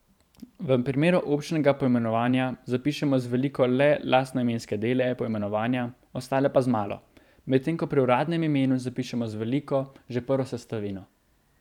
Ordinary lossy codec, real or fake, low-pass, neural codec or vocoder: none; fake; 19.8 kHz; vocoder, 44.1 kHz, 128 mel bands every 512 samples, BigVGAN v2